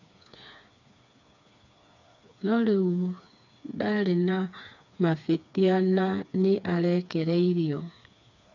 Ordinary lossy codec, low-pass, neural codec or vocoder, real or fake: none; 7.2 kHz; codec, 16 kHz, 4 kbps, FreqCodec, smaller model; fake